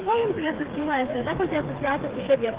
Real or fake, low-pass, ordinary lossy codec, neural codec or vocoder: fake; 3.6 kHz; Opus, 16 kbps; codec, 16 kHz, 4 kbps, FreqCodec, smaller model